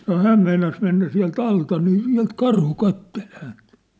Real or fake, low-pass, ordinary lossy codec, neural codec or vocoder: real; none; none; none